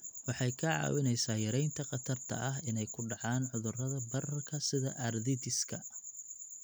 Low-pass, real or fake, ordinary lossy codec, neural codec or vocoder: none; real; none; none